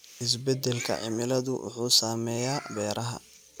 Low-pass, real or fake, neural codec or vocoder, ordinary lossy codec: none; real; none; none